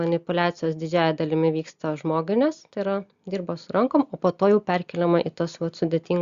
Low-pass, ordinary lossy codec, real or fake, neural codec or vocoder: 7.2 kHz; Opus, 64 kbps; real; none